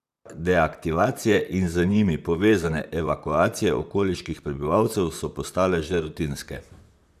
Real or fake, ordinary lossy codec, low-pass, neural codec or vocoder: fake; none; 14.4 kHz; vocoder, 44.1 kHz, 128 mel bands, Pupu-Vocoder